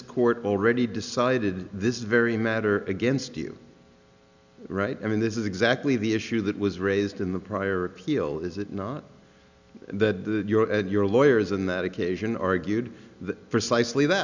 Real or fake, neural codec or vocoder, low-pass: real; none; 7.2 kHz